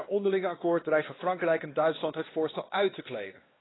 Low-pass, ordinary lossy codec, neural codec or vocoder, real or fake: 7.2 kHz; AAC, 16 kbps; codec, 16 kHz, 2 kbps, X-Codec, WavLM features, trained on Multilingual LibriSpeech; fake